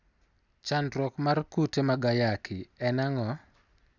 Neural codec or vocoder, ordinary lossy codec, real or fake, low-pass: none; none; real; 7.2 kHz